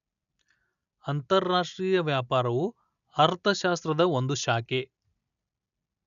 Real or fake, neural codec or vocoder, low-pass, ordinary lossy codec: real; none; 7.2 kHz; none